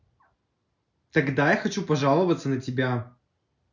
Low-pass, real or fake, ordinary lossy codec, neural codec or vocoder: 7.2 kHz; real; none; none